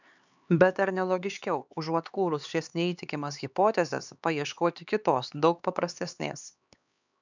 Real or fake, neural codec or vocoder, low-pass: fake; codec, 16 kHz, 4 kbps, X-Codec, HuBERT features, trained on LibriSpeech; 7.2 kHz